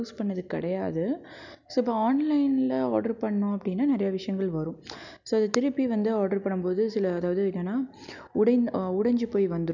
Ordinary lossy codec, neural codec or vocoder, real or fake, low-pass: none; none; real; 7.2 kHz